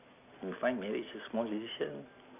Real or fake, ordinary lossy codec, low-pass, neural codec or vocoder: real; none; 3.6 kHz; none